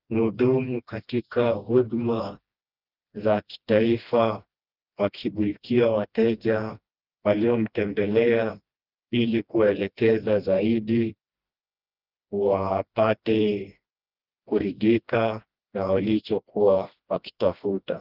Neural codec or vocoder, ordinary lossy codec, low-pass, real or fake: codec, 16 kHz, 1 kbps, FreqCodec, smaller model; Opus, 24 kbps; 5.4 kHz; fake